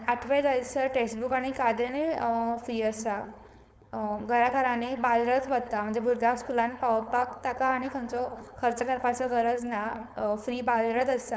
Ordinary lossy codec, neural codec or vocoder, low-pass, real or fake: none; codec, 16 kHz, 4.8 kbps, FACodec; none; fake